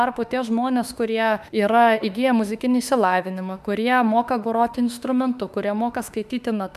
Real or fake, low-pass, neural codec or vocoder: fake; 14.4 kHz; autoencoder, 48 kHz, 32 numbers a frame, DAC-VAE, trained on Japanese speech